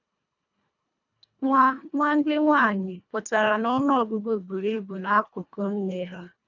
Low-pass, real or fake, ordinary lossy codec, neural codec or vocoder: 7.2 kHz; fake; none; codec, 24 kHz, 1.5 kbps, HILCodec